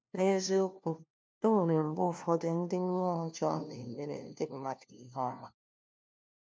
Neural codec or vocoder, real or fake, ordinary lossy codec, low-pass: codec, 16 kHz, 1 kbps, FunCodec, trained on LibriTTS, 50 frames a second; fake; none; none